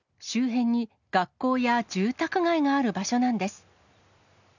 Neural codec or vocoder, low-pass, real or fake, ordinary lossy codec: none; 7.2 kHz; real; none